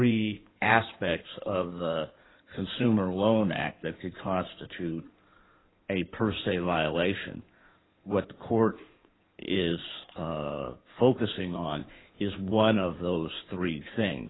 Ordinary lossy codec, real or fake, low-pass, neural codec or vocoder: AAC, 16 kbps; fake; 7.2 kHz; codec, 16 kHz in and 24 kHz out, 2.2 kbps, FireRedTTS-2 codec